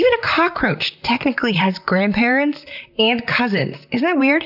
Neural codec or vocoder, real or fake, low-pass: vocoder, 44.1 kHz, 128 mel bands, Pupu-Vocoder; fake; 5.4 kHz